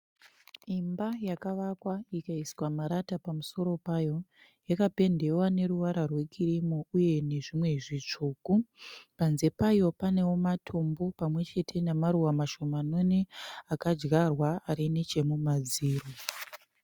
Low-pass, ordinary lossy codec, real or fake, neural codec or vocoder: 19.8 kHz; Opus, 64 kbps; real; none